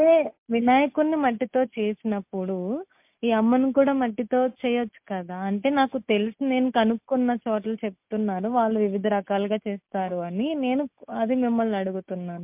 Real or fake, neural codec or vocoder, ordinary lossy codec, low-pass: real; none; MP3, 32 kbps; 3.6 kHz